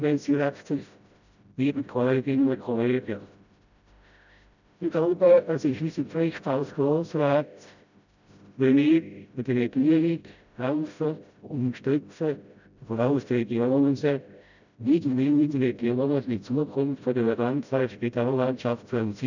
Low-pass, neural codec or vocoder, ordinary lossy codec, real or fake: 7.2 kHz; codec, 16 kHz, 0.5 kbps, FreqCodec, smaller model; none; fake